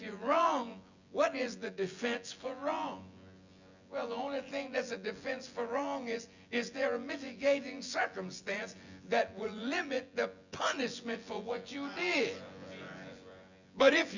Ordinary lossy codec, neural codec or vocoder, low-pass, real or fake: Opus, 64 kbps; vocoder, 24 kHz, 100 mel bands, Vocos; 7.2 kHz; fake